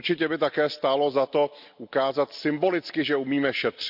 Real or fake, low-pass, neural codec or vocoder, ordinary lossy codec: real; 5.4 kHz; none; none